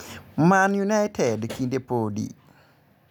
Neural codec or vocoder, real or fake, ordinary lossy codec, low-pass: none; real; none; none